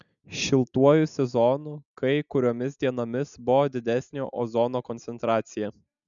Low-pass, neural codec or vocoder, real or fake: 7.2 kHz; none; real